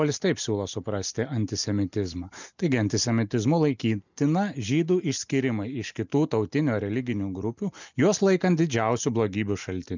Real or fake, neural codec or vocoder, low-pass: real; none; 7.2 kHz